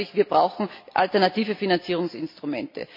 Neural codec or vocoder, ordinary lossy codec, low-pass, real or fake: none; MP3, 32 kbps; 5.4 kHz; real